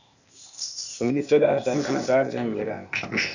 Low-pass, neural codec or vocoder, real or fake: 7.2 kHz; codec, 16 kHz, 0.8 kbps, ZipCodec; fake